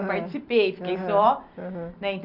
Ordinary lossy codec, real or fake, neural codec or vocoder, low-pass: none; real; none; 5.4 kHz